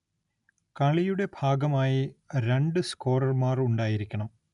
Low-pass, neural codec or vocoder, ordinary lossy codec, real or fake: 10.8 kHz; none; none; real